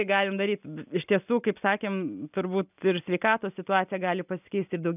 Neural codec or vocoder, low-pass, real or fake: none; 3.6 kHz; real